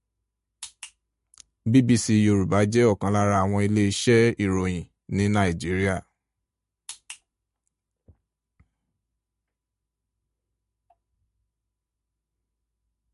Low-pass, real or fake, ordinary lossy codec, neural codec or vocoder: 14.4 kHz; real; MP3, 48 kbps; none